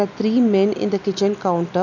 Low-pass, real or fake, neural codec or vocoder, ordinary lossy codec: 7.2 kHz; real; none; none